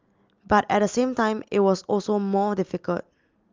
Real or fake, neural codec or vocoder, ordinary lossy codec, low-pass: real; none; Opus, 32 kbps; 7.2 kHz